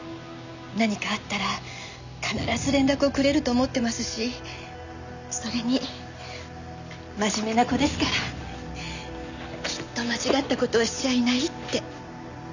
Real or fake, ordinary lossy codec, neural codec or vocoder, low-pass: real; none; none; 7.2 kHz